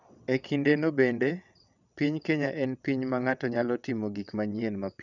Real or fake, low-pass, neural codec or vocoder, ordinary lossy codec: fake; 7.2 kHz; vocoder, 22.05 kHz, 80 mel bands, WaveNeXt; none